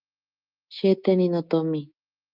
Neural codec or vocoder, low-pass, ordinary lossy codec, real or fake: codec, 24 kHz, 3.1 kbps, DualCodec; 5.4 kHz; Opus, 24 kbps; fake